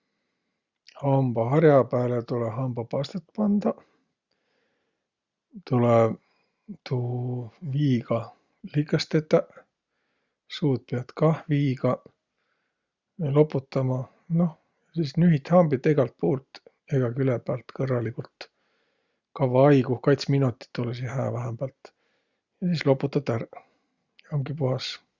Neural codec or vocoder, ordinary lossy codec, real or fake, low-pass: none; Opus, 64 kbps; real; 7.2 kHz